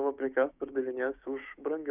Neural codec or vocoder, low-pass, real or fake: codec, 16 kHz, 6 kbps, DAC; 3.6 kHz; fake